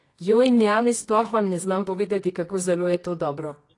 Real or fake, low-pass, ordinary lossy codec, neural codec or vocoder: fake; 10.8 kHz; AAC, 48 kbps; codec, 24 kHz, 0.9 kbps, WavTokenizer, medium music audio release